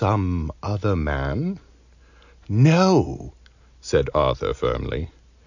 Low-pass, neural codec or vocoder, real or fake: 7.2 kHz; none; real